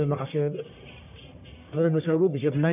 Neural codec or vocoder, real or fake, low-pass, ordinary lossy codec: codec, 44.1 kHz, 1.7 kbps, Pupu-Codec; fake; 3.6 kHz; none